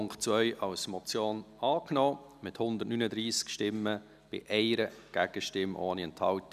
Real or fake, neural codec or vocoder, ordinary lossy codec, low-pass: real; none; none; 14.4 kHz